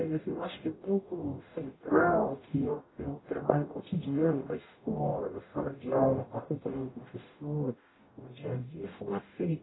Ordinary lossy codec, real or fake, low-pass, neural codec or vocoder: AAC, 16 kbps; fake; 7.2 kHz; codec, 44.1 kHz, 0.9 kbps, DAC